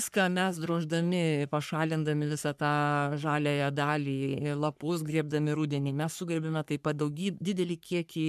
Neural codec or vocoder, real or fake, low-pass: codec, 44.1 kHz, 3.4 kbps, Pupu-Codec; fake; 14.4 kHz